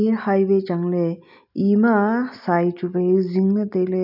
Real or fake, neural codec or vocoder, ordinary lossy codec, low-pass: real; none; none; 5.4 kHz